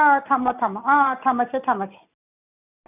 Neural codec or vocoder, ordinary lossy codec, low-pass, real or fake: none; none; 3.6 kHz; real